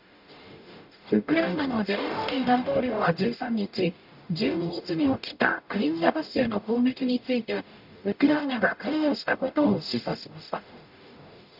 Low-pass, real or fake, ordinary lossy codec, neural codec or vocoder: 5.4 kHz; fake; none; codec, 44.1 kHz, 0.9 kbps, DAC